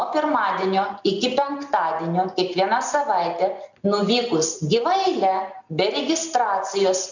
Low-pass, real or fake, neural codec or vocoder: 7.2 kHz; real; none